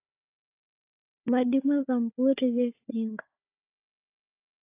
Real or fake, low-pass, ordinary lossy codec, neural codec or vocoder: fake; 3.6 kHz; AAC, 24 kbps; codec, 16 kHz, 4 kbps, FunCodec, trained on Chinese and English, 50 frames a second